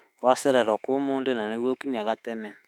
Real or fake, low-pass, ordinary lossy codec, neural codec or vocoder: fake; 19.8 kHz; none; autoencoder, 48 kHz, 32 numbers a frame, DAC-VAE, trained on Japanese speech